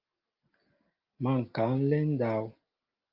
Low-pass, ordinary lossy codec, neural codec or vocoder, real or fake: 5.4 kHz; Opus, 32 kbps; none; real